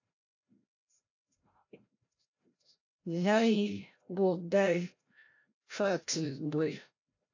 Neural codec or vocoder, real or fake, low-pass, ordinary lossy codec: codec, 16 kHz, 0.5 kbps, FreqCodec, larger model; fake; 7.2 kHz; AAC, 48 kbps